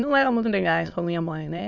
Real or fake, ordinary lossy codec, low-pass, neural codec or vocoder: fake; none; 7.2 kHz; autoencoder, 22.05 kHz, a latent of 192 numbers a frame, VITS, trained on many speakers